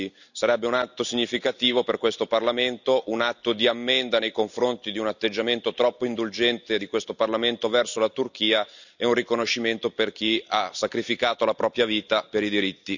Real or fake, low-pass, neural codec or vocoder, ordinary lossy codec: real; 7.2 kHz; none; none